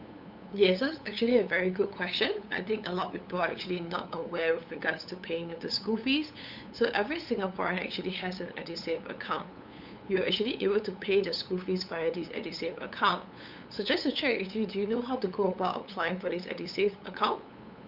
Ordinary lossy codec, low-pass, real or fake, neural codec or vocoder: none; 5.4 kHz; fake; codec, 16 kHz, 8 kbps, FunCodec, trained on LibriTTS, 25 frames a second